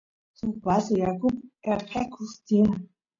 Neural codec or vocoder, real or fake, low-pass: none; real; 7.2 kHz